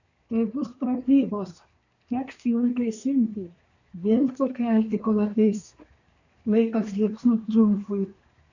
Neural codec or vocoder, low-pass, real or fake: codec, 24 kHz, 1 kbps, SNAC; 7.2 kHz; fake